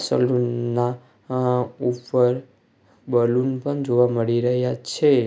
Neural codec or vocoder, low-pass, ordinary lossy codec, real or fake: none; none; none; real